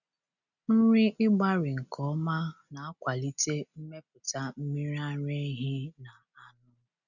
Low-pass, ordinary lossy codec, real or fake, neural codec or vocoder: 7.2 kHz; none; real; none